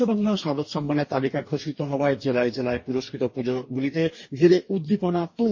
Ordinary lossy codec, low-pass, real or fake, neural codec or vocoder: MP3, 32 kbps; 7.2 kHz; fake; codec, 44.1 kHz, 2.6 kbps, DAC